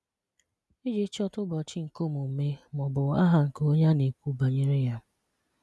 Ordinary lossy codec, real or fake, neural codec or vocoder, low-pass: none; real; none; none